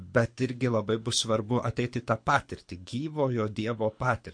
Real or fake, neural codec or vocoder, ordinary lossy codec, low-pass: fake; codec, 24 kHz, 6 kbps, HILCodec; MP3, 48 kbps; 9.9 kHz